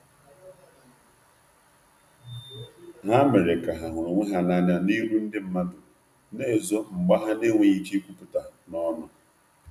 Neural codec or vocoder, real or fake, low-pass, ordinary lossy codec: none; real; 14.4 kHz; none